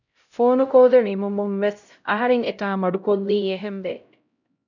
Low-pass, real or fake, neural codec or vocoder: 7.2 kHz; fake; codec, 16 kHz, 0.5 kbps, X-Codec, HuBERT features, trained on LibriSpeech